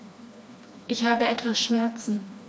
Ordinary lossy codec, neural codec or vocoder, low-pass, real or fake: none; codec, 16 kHz, 2 kbps, FreqCodec, smaller model; none; fake